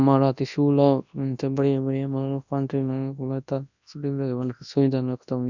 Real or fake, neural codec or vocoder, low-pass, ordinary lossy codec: fake; codec, 24 kHz, 0.9 kbps, WavTokenizer, large speech release; 7.2 kHz; none